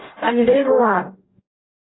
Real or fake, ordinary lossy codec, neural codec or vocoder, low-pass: fake; AAC, 16 kbps; codec, 44.1 kHz, 0.9 kbps, DAC; 7.2 kHz